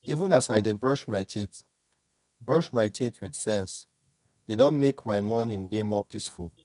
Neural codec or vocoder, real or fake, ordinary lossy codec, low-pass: codec, 24 kHz, 0.9 kbps, WavTokenizer, medium music audio release; fake; none; 10.8 kHz